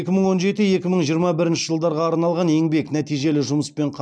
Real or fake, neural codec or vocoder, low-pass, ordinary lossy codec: real; none; none; none